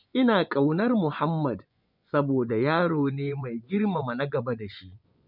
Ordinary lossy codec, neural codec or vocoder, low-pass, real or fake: none; vocoder, 24 kHz, 100 mel bands, Vocos; 5.4 kHz; fake